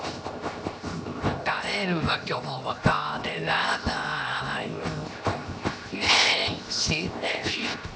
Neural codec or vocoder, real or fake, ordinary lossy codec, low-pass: codec, 16 kHz, 0.7 kbps, FocalCodec; fake; none; none